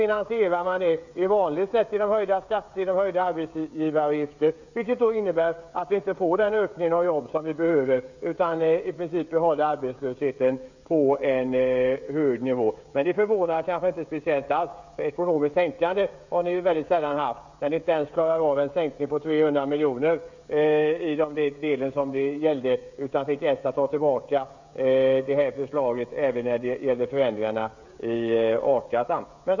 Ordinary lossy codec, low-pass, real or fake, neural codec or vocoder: none; 7.2 kHz; fake; codec, 16 kHz, 16 kbps, FreqCodec, smaller model